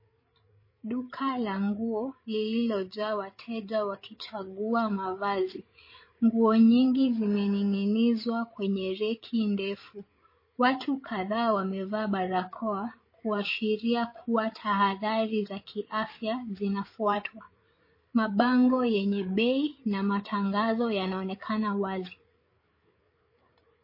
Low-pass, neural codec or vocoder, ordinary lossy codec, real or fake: 5.4 kHz; codec, 16 kHz, 8 kbps, FreqCodec, larger model; MP3, 24 kbps; fake